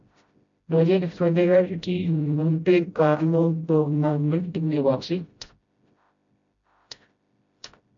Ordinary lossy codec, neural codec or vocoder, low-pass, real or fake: MP3, 48 kbps; codec, 16 kHz, 0.5 kbps, FreqCodec, smaller model; 7.2 kHz; fake